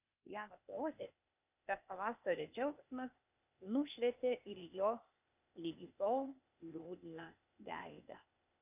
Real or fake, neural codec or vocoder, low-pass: fake; codec, 16 kHz, 0.8 kbps, ZipCodec; 3.6 kHz